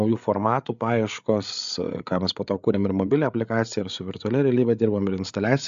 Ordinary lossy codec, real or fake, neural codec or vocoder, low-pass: AAC, 96 kbps; fake; codec, 16 kHz, 8 kbps, FreqCodec, larger model; 7.2 kHz